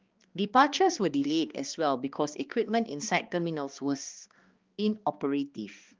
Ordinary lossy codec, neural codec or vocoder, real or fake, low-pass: Opus, 32 kbps; codec, 16 kHz, 2 kbps, X-Codec, HuBERT features, trained on balanced general audio; fake; 7.2 kHz